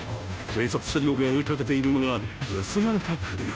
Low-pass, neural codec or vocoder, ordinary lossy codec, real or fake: none; codec, 16 kHz, 0.5 kbps, FunCodec, trained on Chinese and English, 25 frames a second; none; fake